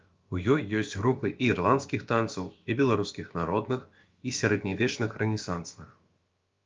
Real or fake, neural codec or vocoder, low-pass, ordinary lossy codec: fake; codec, 16 kHz, about 1 kbps, DyCAST, with the encoder's durations; 7.2 kHz; Opus, 32 kbps